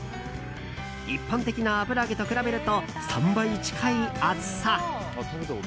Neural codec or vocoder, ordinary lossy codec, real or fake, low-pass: none; none; real; none